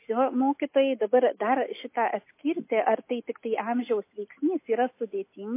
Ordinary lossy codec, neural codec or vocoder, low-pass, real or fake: MP3, 24 kbps; none; 3.6 kHz; real